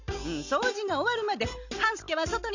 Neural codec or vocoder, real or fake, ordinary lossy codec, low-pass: none; real; none; 7.2 kHz